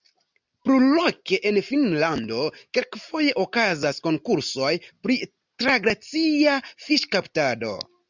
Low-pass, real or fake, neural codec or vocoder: 7.2 kHz; real; none